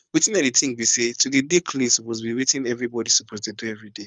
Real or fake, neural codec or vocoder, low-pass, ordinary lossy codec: fake; codec, 24 kHz, 6 kbps, HILCodec; 9.9 kHz; none